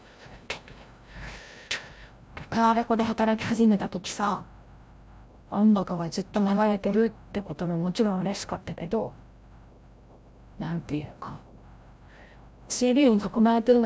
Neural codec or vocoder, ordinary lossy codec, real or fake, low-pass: codec, 16 kHz, 0.5 kbps, FreqCodec, larger model; none; fake; none